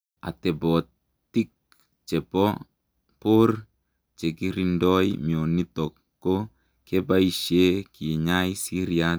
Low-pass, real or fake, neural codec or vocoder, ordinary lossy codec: none; real; none; none